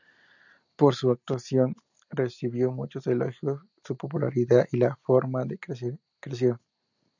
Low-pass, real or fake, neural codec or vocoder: 7.2 kHz; real; none